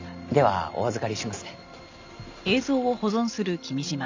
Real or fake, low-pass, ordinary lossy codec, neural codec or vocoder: real; 7.2 kHz; MP3, 64 kbps; none